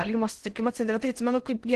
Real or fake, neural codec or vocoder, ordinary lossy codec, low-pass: fake; codec, 16 kHz in and 24 kHz out, 0.8 kbps, FocalCodec, streaming, 65536 codes; Opus, 16 kbps; 10.8 kHz